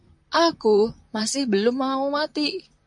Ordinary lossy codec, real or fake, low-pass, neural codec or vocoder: MP3, 64 kbps; fake; 10.8 kHz; vocoder, 44.1 kHz, 128 mel bands, Pupu-Vocoder